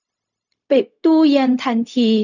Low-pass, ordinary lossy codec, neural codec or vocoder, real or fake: 7.2 kHz; none; codec, 16 kHz, 0.4 kbps, LongCat-Audio-Codec; fake